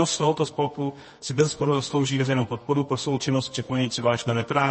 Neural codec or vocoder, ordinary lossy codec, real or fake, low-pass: codec, 24 kHz, 0.9 kbps, WavTokenizer, medium music audio release; MP3, 32 kbps; fake; 10.8 kHz